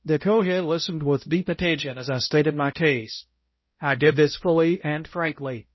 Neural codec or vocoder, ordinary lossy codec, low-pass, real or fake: codec, 16 kHz, 0.5 kbps, X-Codec, HuBERT features, trained on balanced general audio; MP3, 24 kbps; 7.2 kHz; fake